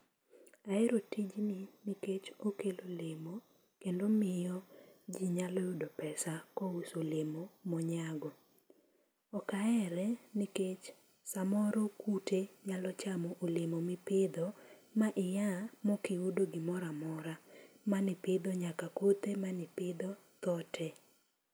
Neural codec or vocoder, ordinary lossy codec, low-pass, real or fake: none; none; none; real